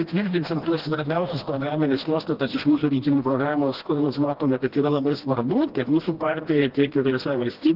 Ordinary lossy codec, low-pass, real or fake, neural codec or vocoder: Opus, 16 kbps; 5.4 kHz; fake; codec, 16 kHz, 1 kbps, FreqCodec, smaller model